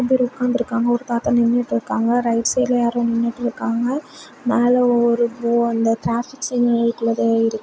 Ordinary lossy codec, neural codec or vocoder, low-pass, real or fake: none; none; none; real